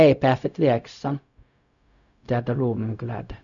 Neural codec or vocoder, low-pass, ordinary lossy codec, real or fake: codec, 16 kHz, 0.4 kbps, LongCat-Audio-Codec; 7.2 kHz; none; fake